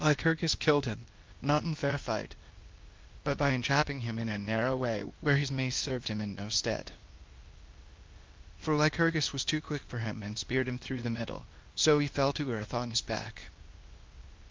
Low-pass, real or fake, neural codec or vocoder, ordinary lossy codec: 7.2 kHz; fake; codec, 16 kHz, 0.8 kbps, ZipCodec; Opus, 32 kbps